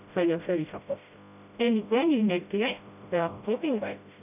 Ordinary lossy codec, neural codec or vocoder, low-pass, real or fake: none; codec, 16 kHz, 0.5 kbps, FreqCodec, smaller model; 3.6 kHz; fake